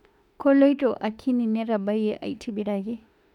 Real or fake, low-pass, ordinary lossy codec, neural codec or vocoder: fake; 19.8 kHz; none; autoencoder, 48 kHz, 32 numbers a frame, DAC-VAE, trained on Japanese speech